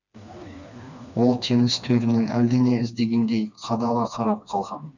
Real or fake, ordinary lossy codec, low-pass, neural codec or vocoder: fake; none; 7.2 kHz; codec, 16 kHz, 2 kbps, FreqCodec, smaller model